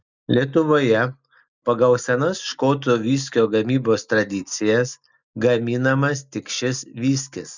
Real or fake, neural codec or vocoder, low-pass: real; none; 7.2 kHz